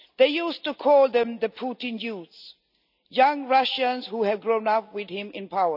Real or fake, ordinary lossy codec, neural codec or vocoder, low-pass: real; none; none; 5.4 kHz